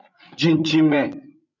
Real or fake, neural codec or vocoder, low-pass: fake; codec, 16 kHz, 16 kbps, FreqCodec, larger model; 7.2 kHz